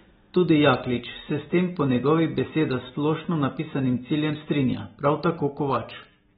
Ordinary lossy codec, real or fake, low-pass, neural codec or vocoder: AAC, 16 kbps; real; 19.8 kHz; none